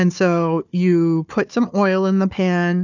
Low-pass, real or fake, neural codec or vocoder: 7.2 kHz; fake; codec, 16 kHz, 4 kbps, FunCodec, trained on Chinese and English, 50 frames a second